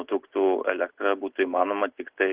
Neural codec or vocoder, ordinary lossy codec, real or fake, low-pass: none; Opus, 16 kbps; real; 3.6 kHz